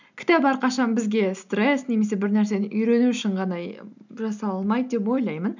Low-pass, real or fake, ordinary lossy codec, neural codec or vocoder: 7.2 kHz; real; none; none